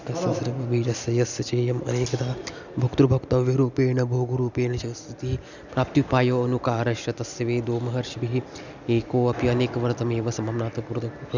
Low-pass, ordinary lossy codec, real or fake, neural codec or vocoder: 7.2 kHz; none; real; none